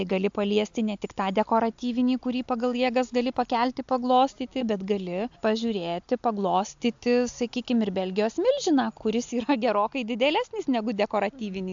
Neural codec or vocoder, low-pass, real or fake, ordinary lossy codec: none; 7.2 kHz; real; AAC, 64 kbps